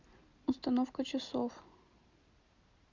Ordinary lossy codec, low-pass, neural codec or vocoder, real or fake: Opus, 64 kbps; 7.2 kHz; none; real